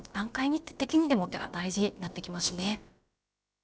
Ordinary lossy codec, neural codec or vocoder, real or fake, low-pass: none; codec, 16 kHz, about 1 kbps, DyCAST, with the encoder's durations; fake; none